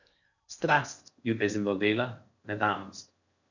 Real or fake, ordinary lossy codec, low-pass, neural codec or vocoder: fake; none; 7.2 kHz; codec, 16 kHz in and 24 kHz out, 0.6 kbps, FocalCodec, streaming, 4096 codes